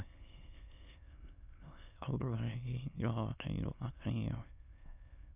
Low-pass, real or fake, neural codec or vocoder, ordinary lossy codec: 3.6 kHz; fake; autoencoder, 22.05 kHz, a latent of 192 numbers a frame, VITS, trained on many speakers; none